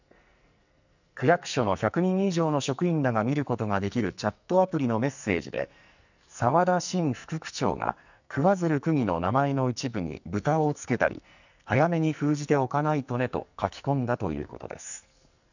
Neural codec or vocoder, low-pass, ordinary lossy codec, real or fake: codec, 44.1 kHz, 2.6 kbps, SNAC; 7.2 kHz; none; fake